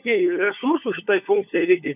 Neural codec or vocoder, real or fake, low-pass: codec, 16 kHz, 4 kbps, FunCodec, trained on LibriTTS, 50 frames a second; fake; 3.6 kHz